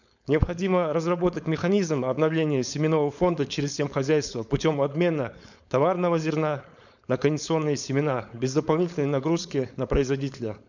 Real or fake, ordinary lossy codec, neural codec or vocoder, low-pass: fake; none; codec, 16 kHz, 4.8 kbps, FACodec; 7.2 kHz